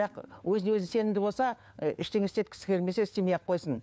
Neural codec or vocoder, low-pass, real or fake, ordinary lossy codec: codec, 16 kHz, 4 kbps, FunCodec, trained on LibriTTS, 50 frames a second; none; fake; none